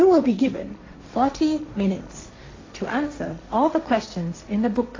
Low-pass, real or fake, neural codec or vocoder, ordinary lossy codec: 7.2 kHz; fake; codec, 16 kHz, 1.1 kbps, Voila-Tokenizer; AAC, 32 kbps